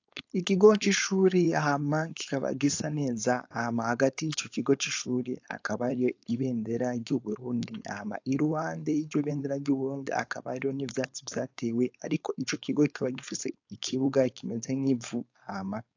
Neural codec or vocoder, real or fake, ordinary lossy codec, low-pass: codec, 16 kHz, 4.8 kbps, FACodec; fake; AAC, 48 kbps; 7.2 kHz